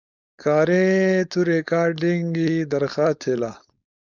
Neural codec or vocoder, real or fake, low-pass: codec, 16 kHz, 4.8 kbps, FACodec; fake; 7.2 kHz